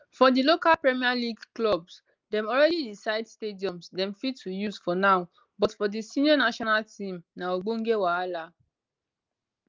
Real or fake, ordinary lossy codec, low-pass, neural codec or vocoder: real; Opus, 32 kbps; 7.2 kHz; none